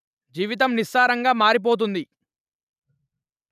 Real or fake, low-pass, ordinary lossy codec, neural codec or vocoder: real; 14.4 kHz; none; none